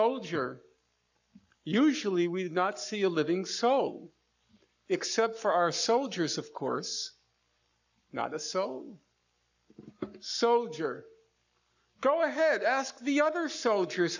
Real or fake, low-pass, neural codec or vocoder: fake; 7.2 kHz; codec, 44.1 kHz, 7.8 kbps, Pupu-Codec